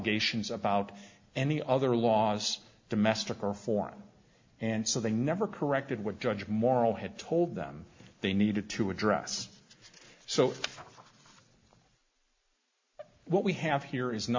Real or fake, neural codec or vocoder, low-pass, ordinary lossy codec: real; none; 7.2 kHz; MP3, 48 kbps